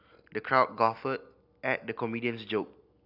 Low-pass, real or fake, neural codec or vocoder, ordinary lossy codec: 5.4 kHz; real; none; none